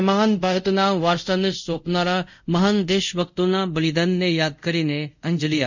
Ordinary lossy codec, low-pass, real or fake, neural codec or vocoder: none; 7.2 kHz; fake; codec, 24 kHz, 0.5 kbps, DualCodec